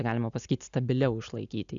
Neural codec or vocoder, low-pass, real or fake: none; 7.2 kHz; real